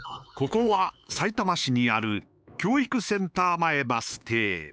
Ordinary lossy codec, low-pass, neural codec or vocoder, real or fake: none; none; codec, 16 kHz, 4 kbps, X-Codec, HuBERT features, trained on LibriSpeech; fake